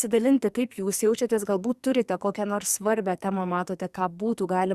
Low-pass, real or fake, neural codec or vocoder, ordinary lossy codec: 14.4 kHz; fake; codec, 44.1 kHz, 2.6 kbps, SNAC; Opus, 64 kbps